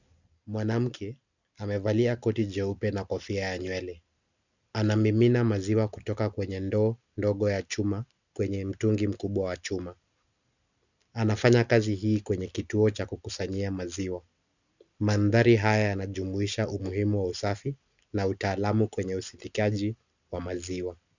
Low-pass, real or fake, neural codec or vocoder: 7.2 kHz; real; none